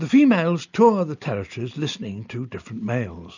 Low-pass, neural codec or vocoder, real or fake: 7.2 kHz; none; real